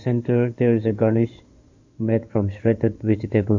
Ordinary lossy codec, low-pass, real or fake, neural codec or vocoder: AAC, 48 kbps; 7.2 kHz; fake; codec, 16 kHz in and 24 kHz out, 2.2 kbps, FireRedTTS-2 codec